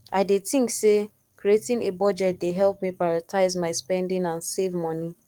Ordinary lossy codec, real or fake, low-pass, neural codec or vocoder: Opus, 32 kbps; fake; 19.8 kHz; codec, 44.1 kHz, 7.8 kbps, DAC